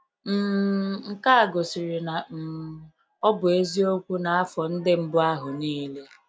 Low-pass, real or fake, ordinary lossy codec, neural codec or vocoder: none; real; none; none